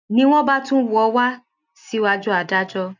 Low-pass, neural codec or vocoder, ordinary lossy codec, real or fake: 7.2 kHz; none; none; real